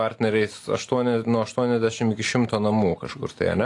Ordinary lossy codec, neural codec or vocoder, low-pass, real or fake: AAC, 48 kbps; none; 10.8 kHz; real